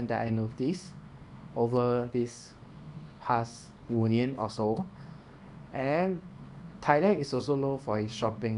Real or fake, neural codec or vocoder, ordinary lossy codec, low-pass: fake; codec, 24 kHz, 0.9 kbps, WavTokenizer, small release; none; 10.8 kHz